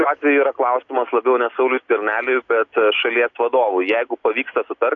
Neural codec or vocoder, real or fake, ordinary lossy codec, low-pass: none; real; AAC, 48 kbps; 7.2 kHz